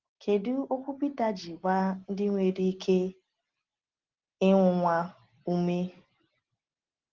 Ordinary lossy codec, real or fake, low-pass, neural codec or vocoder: Opus, 16 kbps; real; 7.2 kHz; none